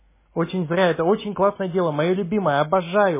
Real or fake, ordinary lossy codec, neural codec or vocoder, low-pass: real; MP3, 16 kbps; none; 3.6 kHz